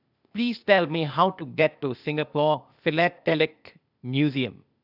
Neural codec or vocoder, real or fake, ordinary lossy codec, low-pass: codec, 16 kHz, 0.8 kbps, ZipCodec; fake; none; 5.4 kHz